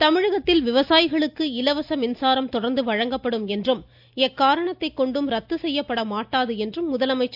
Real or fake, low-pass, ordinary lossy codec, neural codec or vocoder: real; 5.4 kHz; AAC, 48 kbps; none